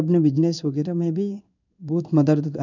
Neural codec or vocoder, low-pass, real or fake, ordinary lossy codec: codec, 16 kHz in and 24 kHz out, 1 kbps, XY-Tokenizer; 7.2 kHz; fake; none